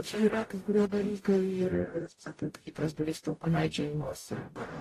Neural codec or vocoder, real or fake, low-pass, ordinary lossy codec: codec, 44.1 kHz, 0.9 kbps, DAC; fake; 14.4 kHz; AAC, 48 kbps